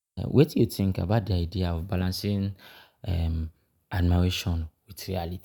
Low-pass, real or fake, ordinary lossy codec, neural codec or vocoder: 19.8 kHz; real; none; none